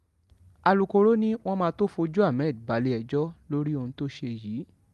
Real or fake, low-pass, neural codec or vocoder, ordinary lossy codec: real; 14.4 kHz; none; Opus, 32 kbps